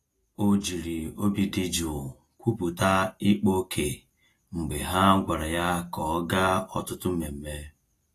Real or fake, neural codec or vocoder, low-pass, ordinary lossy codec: real; none; 14.4 kHz; AAC, 48 kbps